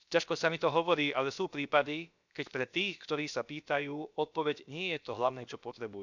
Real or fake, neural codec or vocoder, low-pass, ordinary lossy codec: fake; codec, 16 kHz, about 1 kbps, DyCAST, with the encoder's durations; 7.2 kHz; none